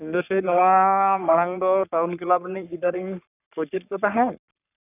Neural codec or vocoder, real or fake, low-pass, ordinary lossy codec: codec, 44.1 kHz, 3.4 kbps, Pupu-Codec; fake; 3.6 kHz; none